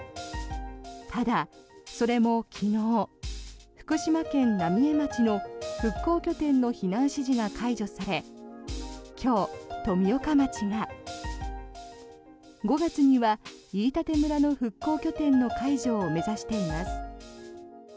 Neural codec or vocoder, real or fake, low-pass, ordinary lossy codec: none; real; none; none